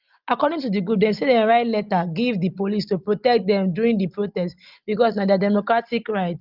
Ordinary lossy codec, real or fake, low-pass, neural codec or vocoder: Opus, 32 kbps; real; 5.4 kHz; none